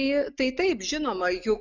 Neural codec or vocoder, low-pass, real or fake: none; 7.2 kHz; real